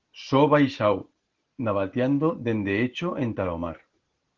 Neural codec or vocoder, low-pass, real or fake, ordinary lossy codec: none; 7.2 kHz; real; Opus, 16 kbps